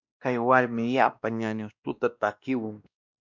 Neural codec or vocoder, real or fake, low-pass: codec, 16 kHz, 1 kbps, X-Codec, WavLM features, trained on Multilingual LibriSpeech; fake; 7.2 kHz